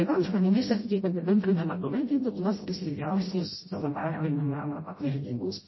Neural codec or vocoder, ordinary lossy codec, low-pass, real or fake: codec, 16 kHz, 0.5 kbps, FreqCodec, smaller model; MP3, 24 kbps; 7.2 kHz; fake